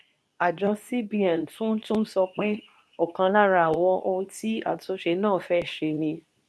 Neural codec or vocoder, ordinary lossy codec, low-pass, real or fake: codec, 24 kHz, 0.9 kbps, WavTokenizer, medium speech release version 2; none; none; fake